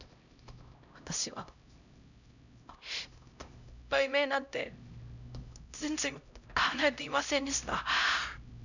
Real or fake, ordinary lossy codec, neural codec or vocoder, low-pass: fake; none; codec, 16 kHz, 0.5 kbps, X-Codec, HuBERT features, trained on LibriSpeech; 7.2 kHz